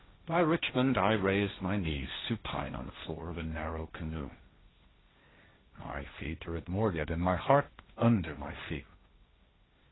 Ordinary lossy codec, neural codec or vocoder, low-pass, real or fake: AAC, 16 kbps; codec, 16 kHz, 1.1 kbps, Voila-Tokenizer; 7.2 kHz; fake